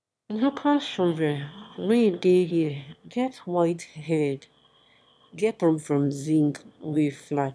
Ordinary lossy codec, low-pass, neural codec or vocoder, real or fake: none; none; autoencoder, 22.05 kHz, a latent of 192 numbers a frame, VITS, trained on one speaker; fake